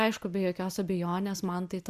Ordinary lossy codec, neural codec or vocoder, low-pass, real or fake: Opus, 64 kbps; none; 14.4 kHz; real